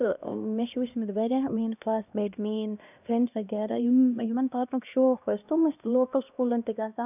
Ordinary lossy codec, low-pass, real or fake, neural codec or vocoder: none; 3.6 kHz; fake; codec, 16 kHz, 1 kbps, X-Codec, HuBERT features, trained on LibriSpeech